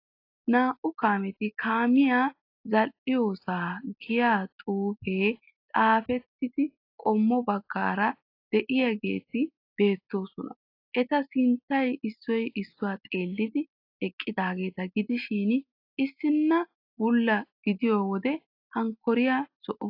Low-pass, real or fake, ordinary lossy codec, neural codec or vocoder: 5.4 kHz; real; AAC, 32 kbps; none